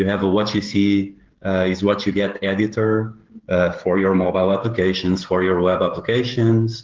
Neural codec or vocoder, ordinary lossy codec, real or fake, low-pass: codec, 16 kHz, 16 kbps, FreqCodec, smaller model; Opus, 16 kbps; fake; 7.2 kHz